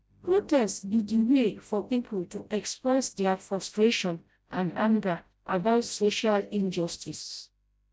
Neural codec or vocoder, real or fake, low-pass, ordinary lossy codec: codec, 16 kHz, 0.5 kbps, FreqCodec, smaller model; fake; none; none